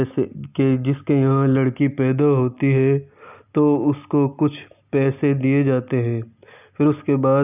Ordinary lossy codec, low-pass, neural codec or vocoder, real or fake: none; 3.6 kHz; none; real